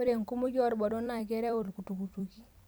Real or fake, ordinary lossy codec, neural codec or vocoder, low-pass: fake; none; vocoder, 44.1 kHz, 128 mel bands every 256 samples, BigVGAN v2; none